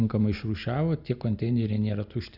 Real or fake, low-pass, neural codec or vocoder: real; 5.4 kHz; none